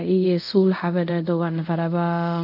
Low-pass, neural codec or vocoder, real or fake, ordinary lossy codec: 5.4 kHz; codec, 24 kHz, 0.5 kbps, DualCodec; fake; none